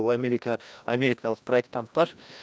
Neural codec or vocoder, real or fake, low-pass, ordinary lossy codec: codec, 16 kHz, 1 kbps, FreqCodec, larger model; fake; none; none